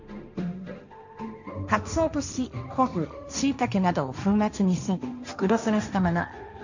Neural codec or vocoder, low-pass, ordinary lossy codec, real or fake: codec, 16 kHz, 1.1 kbps, Voila-Tokenizer; 7.2 kHz; none; fake